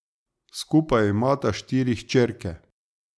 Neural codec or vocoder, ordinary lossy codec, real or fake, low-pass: none; none; real; none